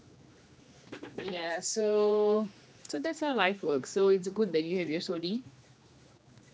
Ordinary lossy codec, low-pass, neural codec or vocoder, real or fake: none; none; codec, 16 kHz, 2 kbps, X-Codec, HuBERT features, trained on general audio; fake